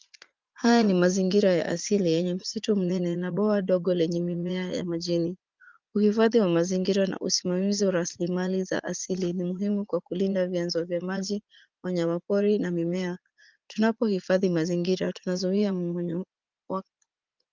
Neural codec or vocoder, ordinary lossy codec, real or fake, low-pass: vocoder, 44.1 kHz, 80 mel bands, Vocos; Opus, 32 kbps; fake; 7.2 kHz